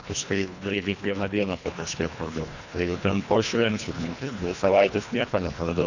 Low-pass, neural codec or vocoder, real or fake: 7.2 kHz; codec, 24 kHz, 1.5 kbps, HILCodec; fake